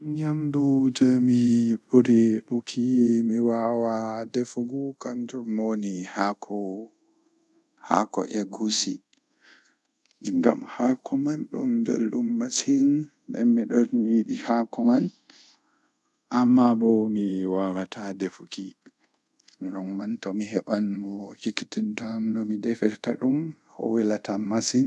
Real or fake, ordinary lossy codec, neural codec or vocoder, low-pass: fake; none; codec, 24 kHz, 0.5 kbps, DualCodec; none